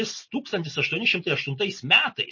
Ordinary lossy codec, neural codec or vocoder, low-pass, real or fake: MP3, 32 kbps; none; 7.2 kHz; real